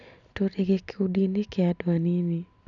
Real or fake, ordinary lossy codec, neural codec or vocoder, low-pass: real; none; none; 7.2 kHz